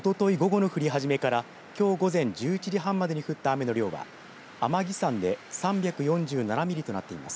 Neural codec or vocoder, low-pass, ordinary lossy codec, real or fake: none; none; none; real